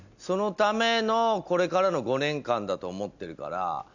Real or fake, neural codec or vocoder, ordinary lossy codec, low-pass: real; none; none; 7.2 kHz